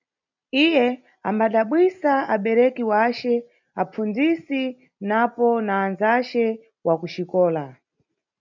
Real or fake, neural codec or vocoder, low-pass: real; none; 7.2 kHz